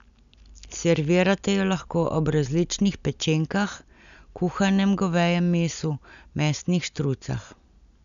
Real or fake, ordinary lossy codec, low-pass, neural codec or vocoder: real; none; 7.2 kHz; none